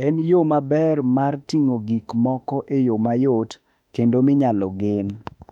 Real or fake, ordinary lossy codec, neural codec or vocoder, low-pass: fake; none; autoencoder, 48 kHz, 32 numbers a frame, DAC-VAE, trained on Japanese speech; 19.8 kHz